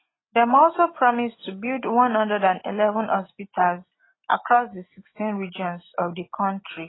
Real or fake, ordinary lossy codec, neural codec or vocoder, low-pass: real; AAC, 16 kbps; none; 7.2 kHz